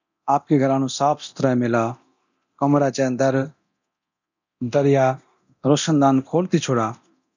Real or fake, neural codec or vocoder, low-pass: fake; codec, 24 kHz, 0.9 kbps, DualCodec; 7.2 kHz